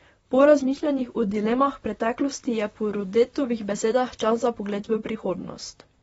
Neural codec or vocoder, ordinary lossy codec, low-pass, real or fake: vocoder, 44.1 kHz, 128 mel bands, Pupu-Vocoder; AAC, 24 kbps; 19.8 kHz; fake